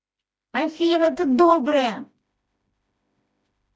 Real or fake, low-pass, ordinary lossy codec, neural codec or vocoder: fake; none; none; codec, 16 kHz, 1 kbps, FreqCodec, smaller model